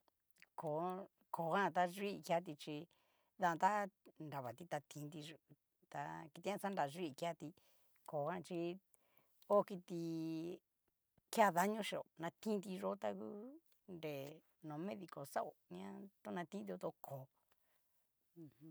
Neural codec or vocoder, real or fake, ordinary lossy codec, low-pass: none; real; none; none